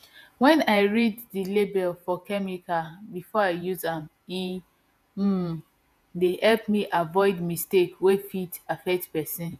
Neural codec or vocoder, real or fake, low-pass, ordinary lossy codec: vocoder, 48 kHz, 128 mel bands, Vocos; fake; 14.4 kHz; none